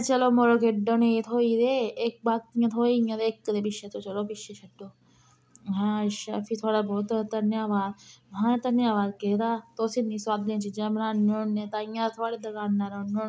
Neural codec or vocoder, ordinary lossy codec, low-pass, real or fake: none; none; none; real